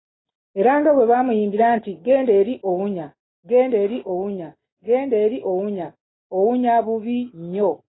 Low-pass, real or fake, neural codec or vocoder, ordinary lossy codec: 7.2 kHz; real; none; AAC, 16 kbps